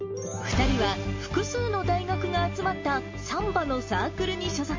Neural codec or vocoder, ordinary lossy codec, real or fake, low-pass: none; MP3, 32 kbps; real; 7.2 kHz